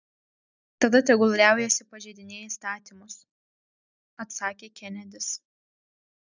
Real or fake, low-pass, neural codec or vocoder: real; 7.2 kHz; none